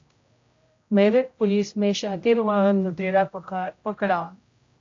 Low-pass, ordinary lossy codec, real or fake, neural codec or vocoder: 7.2 kHz; AAC, 48 kbps; fake; codec, 16 kHz, 0.5 kbps, X-Codec, HuBERT features, trained on general audio